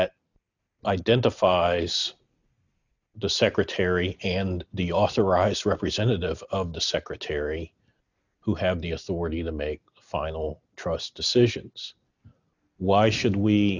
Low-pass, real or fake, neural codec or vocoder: 7.2 kHz; real; none